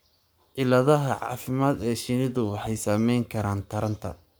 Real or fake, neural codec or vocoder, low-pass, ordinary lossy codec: fake; codec, 44.1 kHz, 7.8 kbps, Pupu-Codec; none; none